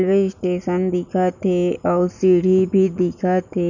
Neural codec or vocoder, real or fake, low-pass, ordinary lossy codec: none; real; 7.2 kHz; none